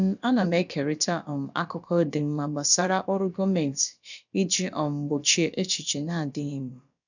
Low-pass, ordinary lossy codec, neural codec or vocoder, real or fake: 7.2 kHz; none; codec, 16 kHz, about 1 kbps, DyCAST, with the encoder's durations; fake